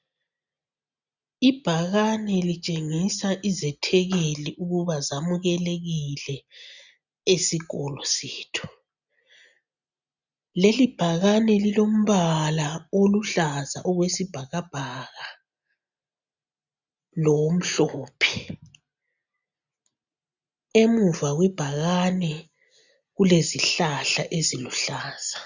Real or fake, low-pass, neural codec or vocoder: real; 7.2 kHz; none